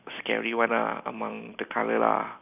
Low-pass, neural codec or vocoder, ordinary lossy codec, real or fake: 3.6 kHz; none; none; real